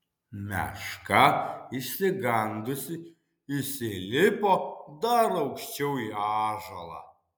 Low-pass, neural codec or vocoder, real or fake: 19.8 kHz; none; real